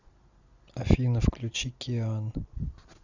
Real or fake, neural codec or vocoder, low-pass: real; none; 7.2 kHz